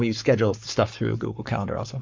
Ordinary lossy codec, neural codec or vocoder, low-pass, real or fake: MP3, 48 kbps; codec, 44.1 kHz, 7.8 kbps, Pupu-Codec; 7.2 kHz; fake